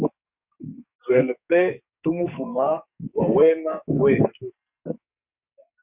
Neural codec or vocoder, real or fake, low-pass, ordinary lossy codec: codec, 44.1 kHz, 2.6 kbps, SNAC; fake; 3.6 kHz; Opus, 64 kbps